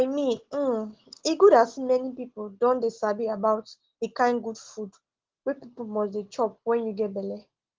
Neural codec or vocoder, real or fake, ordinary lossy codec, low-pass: none; real; Opus, 16 kbps; 7.2 kHz